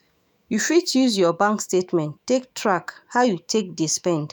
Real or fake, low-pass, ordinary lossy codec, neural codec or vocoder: fake; none; none; autoencoder, 48 kHz, 128 numbers a frame, DAC-VAE, trained on Japanese speech